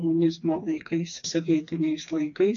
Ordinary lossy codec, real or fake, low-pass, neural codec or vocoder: AAC, 48 kbps; fake; 7.2 kHz; codec, 16 kHz, 2 kbps, FreqCodec, smaller model